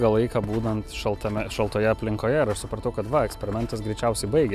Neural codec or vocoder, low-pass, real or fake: none; 14.4 kHz; real